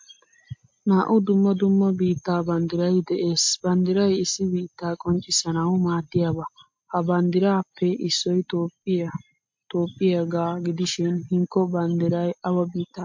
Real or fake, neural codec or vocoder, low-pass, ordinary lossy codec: real; none; 7.2 kHz; MP3, 48 kbps